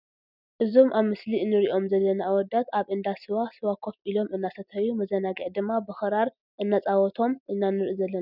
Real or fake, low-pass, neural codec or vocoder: real; 5.4 kHz; none